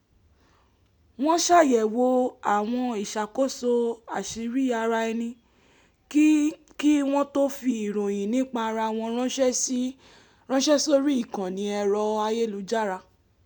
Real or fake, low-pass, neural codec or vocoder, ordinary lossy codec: real; 19.8 kHz; none; none